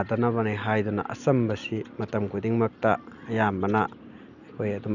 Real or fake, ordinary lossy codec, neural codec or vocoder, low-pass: real; none; none; 7.2 kHz